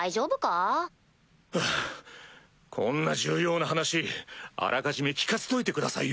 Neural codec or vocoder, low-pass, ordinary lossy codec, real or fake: none; none; none; real